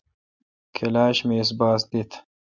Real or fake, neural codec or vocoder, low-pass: real; none; 7.2 kHz